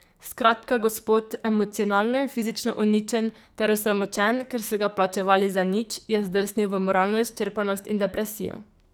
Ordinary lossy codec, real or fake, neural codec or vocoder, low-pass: none; fake; codec, 44.1 kHz, 2.6 kbps, SNAC; none